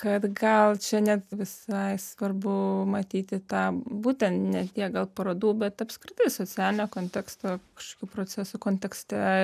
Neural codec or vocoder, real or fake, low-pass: none; real; 14.4 kHz